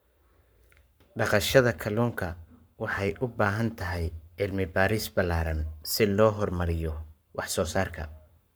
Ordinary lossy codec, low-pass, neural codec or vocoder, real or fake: none; none; codec, 44.1 kHz, 7.8 kbps, Pupu-Codec; fake